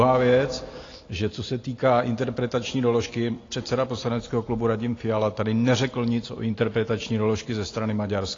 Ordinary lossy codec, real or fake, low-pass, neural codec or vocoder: AAC, 32 kbps; real; 7.2 kHz; none